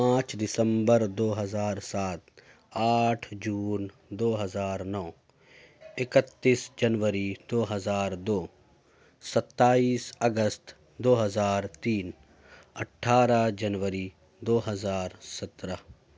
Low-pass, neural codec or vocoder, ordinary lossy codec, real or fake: none; none; none; real